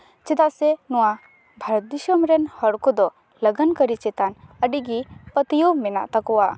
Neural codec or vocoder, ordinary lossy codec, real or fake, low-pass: none; none; real; none